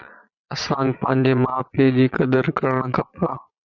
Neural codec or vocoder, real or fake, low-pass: vocoder, 22.05 kHz, 80 mel bands, Vocos; fake; 7.2 kHz